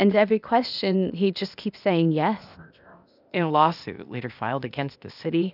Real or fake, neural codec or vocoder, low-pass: fake; codec, 16 kHz, 0.8 kbps, ZipCodec; 5.4 kHz